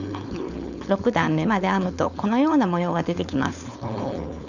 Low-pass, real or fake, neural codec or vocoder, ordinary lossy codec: 7.2 kHz; fake; codec, 16 kHz, 4.8 kbps, FACodec; none